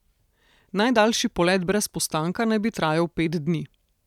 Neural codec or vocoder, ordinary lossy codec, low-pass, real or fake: none; none; 19.8 kHz; real